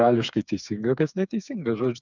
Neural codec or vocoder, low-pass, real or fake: codec, 16 kHz, 4 kbps, FreqCodec, smaller model; 7.2 kHz; fake